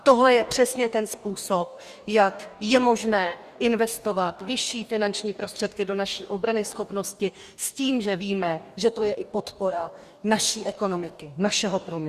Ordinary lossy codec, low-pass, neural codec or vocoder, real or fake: Opus, 64 kbps; 14.4 kHz; codec, 44.1 kHz, 2.6 kbps, DAC; fake